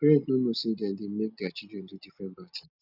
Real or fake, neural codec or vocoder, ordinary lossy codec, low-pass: real; none; none; 5.4 kHz